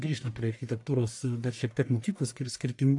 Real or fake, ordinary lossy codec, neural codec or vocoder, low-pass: fake; AAC, 64 kbps; codec, 44.1 kHz, 1.7 kbps, Pupu-Codec; 10.8 kHz